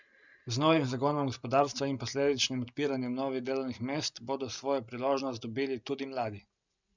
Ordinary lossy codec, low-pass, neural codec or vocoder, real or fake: none; 7.2 kHz; none; real